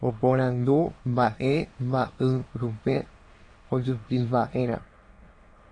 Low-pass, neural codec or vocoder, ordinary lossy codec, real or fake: 9.9 kHz; autoencoder, 22.05 kHz, a latent of 192 numbers a frame, VITS, trained on many speakers; AAC, 32 kbps; fake